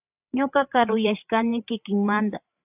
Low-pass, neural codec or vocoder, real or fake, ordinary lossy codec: 3.6 kHz; codec, 16 kHz, 8 kbps, FreqCodec, larger model; fake; AAC, 32 kbps